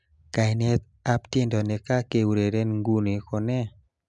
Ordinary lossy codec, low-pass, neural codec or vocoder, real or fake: MP3, 96 kbps; 10.8 kHz; none; real